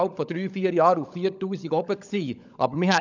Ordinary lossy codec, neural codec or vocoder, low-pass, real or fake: none; codec, 16 kHz, 16 kbps, FunCodec, trained on LibriTTS, 50 frames a second; 7.2 kHz; fake